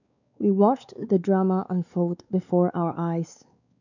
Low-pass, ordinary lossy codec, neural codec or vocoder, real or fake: 7.2 kHz; none; codec, 16 kHz, 4 kbps, X-Codec, WavLM features, trained on Multilingual LibriSpeech; fake